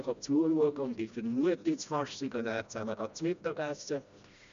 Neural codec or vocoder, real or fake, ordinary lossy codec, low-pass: codec, 16 kHz, 1 kbps, FreqCodec, smaller model; fake; MP3, 64 kbps; 7.2 kHz